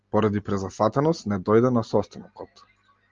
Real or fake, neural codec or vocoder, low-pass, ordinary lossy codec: real; none; 7.2 kHz; Opus, 32 kbps